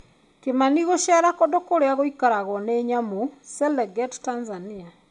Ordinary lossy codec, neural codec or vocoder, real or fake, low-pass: none; none; real; 10.8 kHz